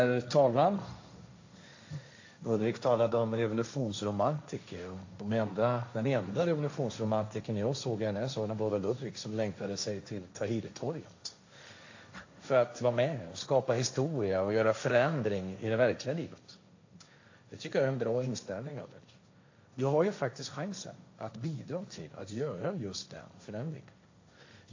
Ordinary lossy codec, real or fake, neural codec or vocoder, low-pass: AAC, 48 kbps; fake; codec, 16 kHz, 1.1 kbps, Voila-Tokenizer; 7.2 kHz